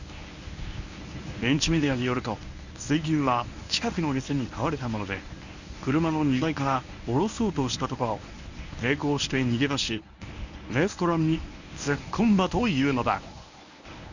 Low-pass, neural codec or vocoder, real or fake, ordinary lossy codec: 7.2 kHz; codec, 24 kHz, 0.9 kbps, WavTokenizer, medium speech release version 1; fake; none